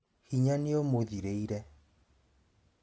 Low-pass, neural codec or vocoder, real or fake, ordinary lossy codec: none; none; real; none